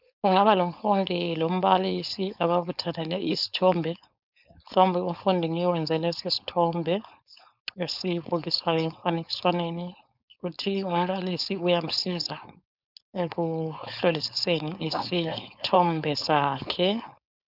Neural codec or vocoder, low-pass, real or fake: codec, 16 kHz, 4.8 kbps, FACodec; 5.4 kHz; fake